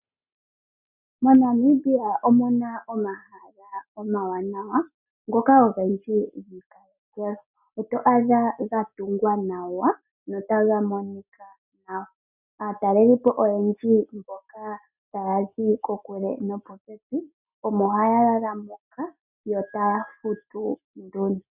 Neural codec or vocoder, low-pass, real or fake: none; 3.6 kHz; real